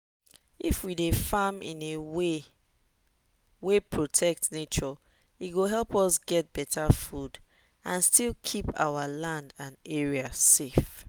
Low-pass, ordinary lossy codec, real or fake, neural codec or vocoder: none; none; real; none